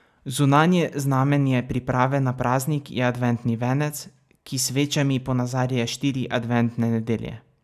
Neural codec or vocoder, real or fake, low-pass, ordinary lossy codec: none; real; 14.4 kHz; none